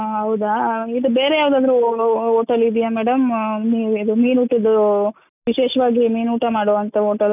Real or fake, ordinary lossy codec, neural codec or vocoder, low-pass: real; none; none; 3.6 kHz